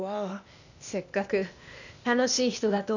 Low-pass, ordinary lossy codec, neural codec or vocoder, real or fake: 7.2 kHz; none; codec, 16 kHz, 0.8 kbps, ZipCodec; fake